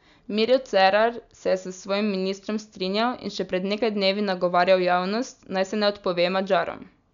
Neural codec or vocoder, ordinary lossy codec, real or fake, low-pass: none; none; real; 7.2 kHz